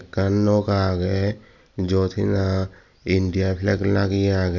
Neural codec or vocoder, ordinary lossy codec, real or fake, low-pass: none; none; real; 7.2 kHz